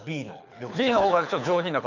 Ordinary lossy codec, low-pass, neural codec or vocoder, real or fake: none; 7.2 kHz; codec, 24 kHz, 6 kbps, HILCodec; fake